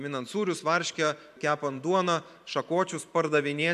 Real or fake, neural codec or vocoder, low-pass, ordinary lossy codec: fake; vocoder, 44.1 kHz, 128 mel bands every 512 samples, BigVGAN v2; 14.4 kHz; MP3, 96 kbps